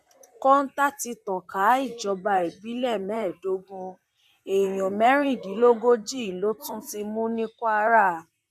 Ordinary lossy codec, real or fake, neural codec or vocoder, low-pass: none; fake; vocoder, 44.1 kHz, 128 mel bands, Pupu-Vocoder; 14.4 kHz